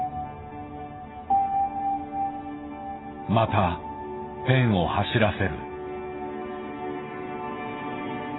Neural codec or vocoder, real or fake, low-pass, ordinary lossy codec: none; real; 7.2 kHz; AAC, 16 kbps